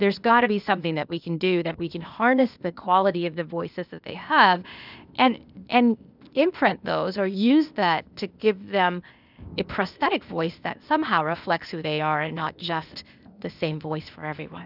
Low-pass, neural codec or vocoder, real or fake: 5.4 kHz; codec, 16 kHz, 0.8 kbps, ZipCodec; fake